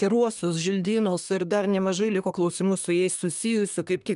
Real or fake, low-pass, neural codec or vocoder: fake; 10.8 kHz; codec, 24 kHz, 1 kbps, SNAC